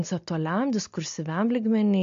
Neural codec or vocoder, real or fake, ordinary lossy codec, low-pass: none; real; MP3, 48 kbps; 7.2 kHz